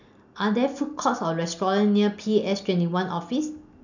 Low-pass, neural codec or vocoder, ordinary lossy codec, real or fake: 7.2 kHz; none; none; real